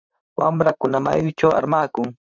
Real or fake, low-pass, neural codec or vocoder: fake; 7.2 kHz; vocoder, 44.1 kHz, 128 mel bands, Pupu-Vocoder